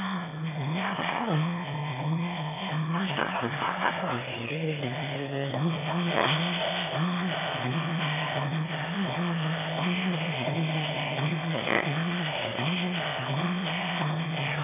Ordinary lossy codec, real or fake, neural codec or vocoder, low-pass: none; fake; autoencoder, 22.05 kHz, a latent of 192 numbers a frame, VITS, trained on one speaker; 3.6 kHz